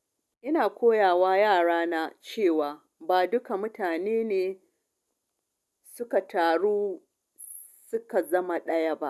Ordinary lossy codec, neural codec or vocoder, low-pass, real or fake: none; none; none; real